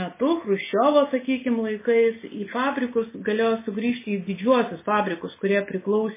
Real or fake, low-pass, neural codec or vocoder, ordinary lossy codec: real; 3.6 kHz; none; MP3, 16 kbps